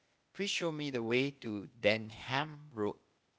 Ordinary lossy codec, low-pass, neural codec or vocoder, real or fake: none; none; codec, 16 kHz, 0.8 kbps, ZipCodec; fake